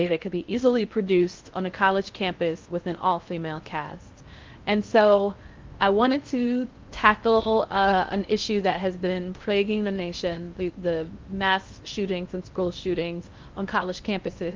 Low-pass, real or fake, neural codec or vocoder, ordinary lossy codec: 7.2 kHz; fake; codec, 16 kHz in and 24 kHz out, 0.6 kbps, FocalCodec, streaming, 4096 codes; Opus, 32 kbps